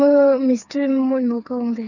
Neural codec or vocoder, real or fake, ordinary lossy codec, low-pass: codec, 16 kHz, 4 kbps, FreqCodec, smaller model; fake; none; 7.2 kHz